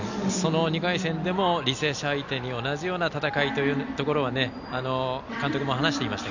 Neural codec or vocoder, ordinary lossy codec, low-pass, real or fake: none; none; 7.2 kHz; real